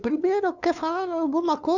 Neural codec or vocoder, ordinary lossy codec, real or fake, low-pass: codec, 16 kHz, 2 kbps, FunCodec, trained on LibriTTS, 25 frames a second; none; fake; 7.2 kHz